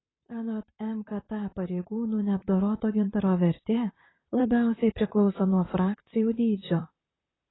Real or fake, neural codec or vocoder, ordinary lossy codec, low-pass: fake; vocoder, 44.1 kHz, 128 mel bands, Pupu-Vocoder; AAC, 16 kbps; 7.2 kHz